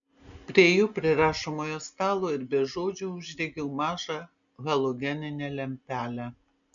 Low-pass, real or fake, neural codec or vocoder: 7.2 kHz; real; none